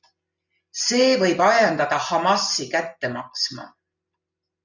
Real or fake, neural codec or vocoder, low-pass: real; none; 7.2 kHz